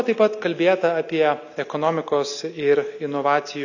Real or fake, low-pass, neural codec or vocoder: real; 7.2 kHz; none